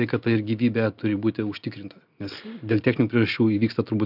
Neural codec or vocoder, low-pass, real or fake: none; 5.4 kHz; real